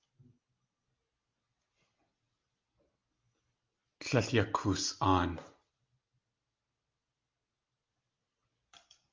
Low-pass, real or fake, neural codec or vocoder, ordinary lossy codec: 7.2 kHz; real; none; Opus, 24 kbps